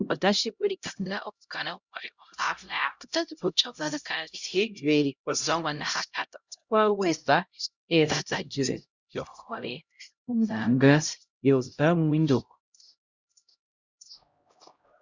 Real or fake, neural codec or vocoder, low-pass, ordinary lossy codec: fake; codec, 16 kHz, 0.5 kbps, X-Codec, HuBERT features, trained on LibriSpeech; 7.2 kHz; Opus, 64 kbps